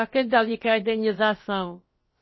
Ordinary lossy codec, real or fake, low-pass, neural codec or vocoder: MP3, 24 kbps; fake; 7.2 kHz; codec, 16 kHz, about 1 kbps, DyCAST, with the encoder's durations